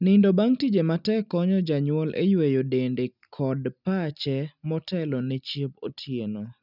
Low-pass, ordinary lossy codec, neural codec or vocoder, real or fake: 5.4 kHz; none; none; real